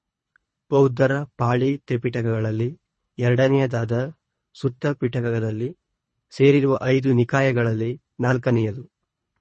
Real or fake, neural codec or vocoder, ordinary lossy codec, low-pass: fake; codec, 24 kHz, 3 kbps, HILCodec; MP3, 32 kbps; 10.8 kHz